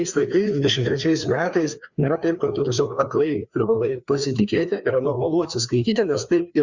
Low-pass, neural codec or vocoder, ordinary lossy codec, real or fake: 7.2 kHz; codec, 16 kHz, 2 kbps, FreqCodec, larger model; Opus, 64 kbps; fake